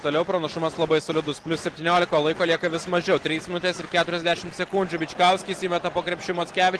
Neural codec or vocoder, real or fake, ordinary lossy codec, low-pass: none; real; Opus, 16 kbps; 10.8 kHz